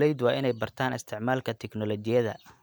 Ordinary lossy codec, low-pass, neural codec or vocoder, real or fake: none; none; none; real